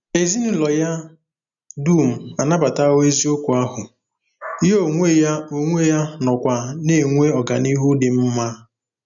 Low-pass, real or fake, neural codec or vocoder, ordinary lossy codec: 7.2 kHz; real; none; none